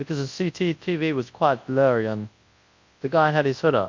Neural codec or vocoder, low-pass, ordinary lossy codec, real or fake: codec, 24 kHz, 0.9 kbps, WavTokenizer, large speech release; 7.2 kHz; MP3, 48 kbps; fake